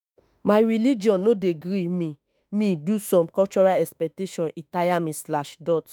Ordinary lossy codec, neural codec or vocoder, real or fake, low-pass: none; autoencoder, 48 kHz, 32 numbers a frame, DAC-VAE, trained on Japanese speech; fake; none